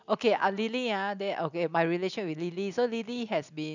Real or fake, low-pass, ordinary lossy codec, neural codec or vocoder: real; 7.2 kHz; none; none